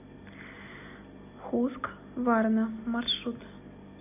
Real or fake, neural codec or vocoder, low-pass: real; none; 3.6 kHz